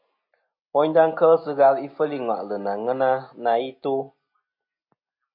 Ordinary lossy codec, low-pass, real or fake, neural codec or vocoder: MP3, 32 kbps; 5.4 kHz; real; none